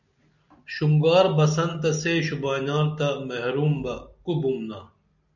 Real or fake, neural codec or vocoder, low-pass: real; none; 7.2 kHz